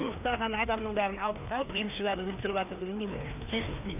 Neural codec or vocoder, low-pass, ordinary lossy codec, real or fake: codec, 16 kHz, 2 kbps, FreqCodec, larger model; 3.6 kHz; none; fake